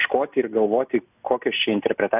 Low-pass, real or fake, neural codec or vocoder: 3.6 kHz; real; none